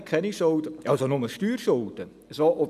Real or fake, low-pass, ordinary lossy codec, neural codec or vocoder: real; 14.4 kHz; none; none